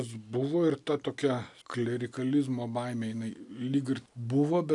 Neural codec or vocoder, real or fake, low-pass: none; real; 10.8 kHz